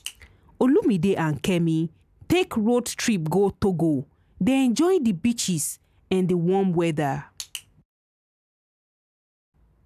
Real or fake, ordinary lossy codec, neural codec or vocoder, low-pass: real; none; none; 14.4 kHz